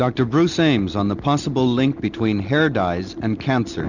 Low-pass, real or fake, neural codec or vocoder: 7.2 kHz; real; none